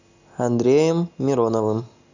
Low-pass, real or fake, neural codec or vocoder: 7.2 kHz; real; none